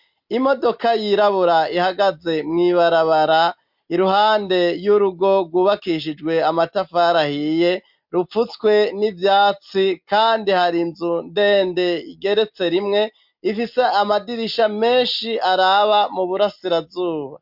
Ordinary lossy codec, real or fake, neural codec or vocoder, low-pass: MP3, 48 kbps; real; none; 5.4 kHz